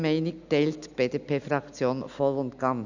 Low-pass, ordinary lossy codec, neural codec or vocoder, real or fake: 7.2 kHz; none; none; real